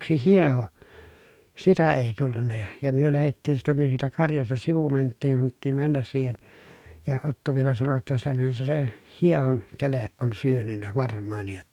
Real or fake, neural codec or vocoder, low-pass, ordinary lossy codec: fake; codec, 44.1 kHz, 2.6 kbps, DAC; 19.8 kHz; none